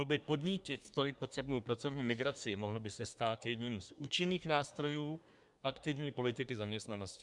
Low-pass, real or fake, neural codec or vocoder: 10.8 kHz; fake; codec, 24 kHz, 1 kbps, SNAC